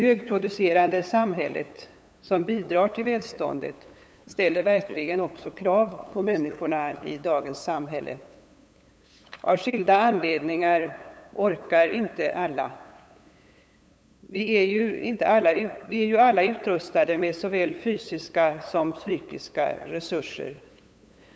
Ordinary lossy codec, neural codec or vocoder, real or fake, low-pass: none; codec, 16 kHz, 8 kbps, FunCodec, trained on LibriTTS, 25 frames a second; fake; none